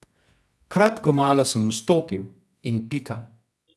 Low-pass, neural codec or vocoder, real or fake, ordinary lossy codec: none; codec, 24 kHz, 0.9 kbps, WavTokenizer, medium music audio release; fake; none